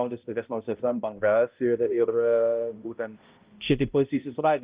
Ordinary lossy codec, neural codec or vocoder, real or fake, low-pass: Opus, 24 kbps; codec, 16 kHz, 0.5 kbps, X-Codec, HuBERT features, trained on balanced general audio; fake; 3.6 kHz